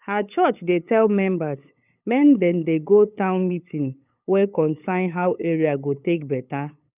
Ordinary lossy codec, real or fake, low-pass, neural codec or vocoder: none; fake; 3.6 kHz; codec, 16 kHz, 8 kbps, FunCodec, trained on LibriTTS, 25 frames a second